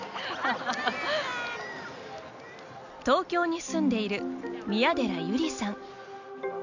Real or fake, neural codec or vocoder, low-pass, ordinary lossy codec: real; none; 7.2 kHz; none